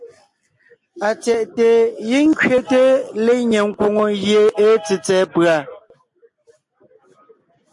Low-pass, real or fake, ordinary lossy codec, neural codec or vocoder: 10.8 kHz; real; MP3, 48 kbps; none